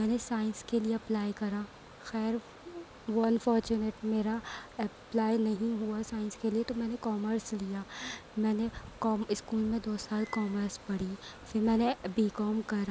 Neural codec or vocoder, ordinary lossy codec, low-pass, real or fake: none; none; none; real